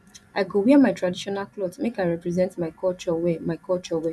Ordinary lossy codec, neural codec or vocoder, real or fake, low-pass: none; vocoder, 24 kHz, 100 mel bands, Vocos; fake; none